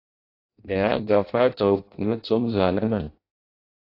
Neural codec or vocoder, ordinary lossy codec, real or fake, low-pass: codec, 16 kHz in and 24 kHz out, 0.6 kbps, FireRedTTS-2 codec; AAC, 32 kbps; fake; 5.4 kHz